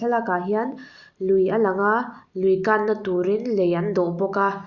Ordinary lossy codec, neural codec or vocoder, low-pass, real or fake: Opus, 64 kbps; none; 7.2 kHz; real